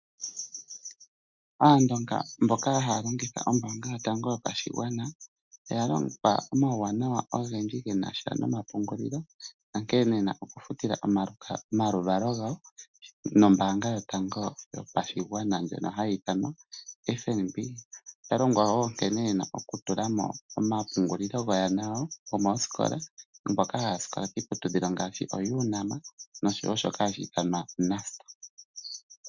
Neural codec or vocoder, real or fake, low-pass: none; real; 7.2 kHz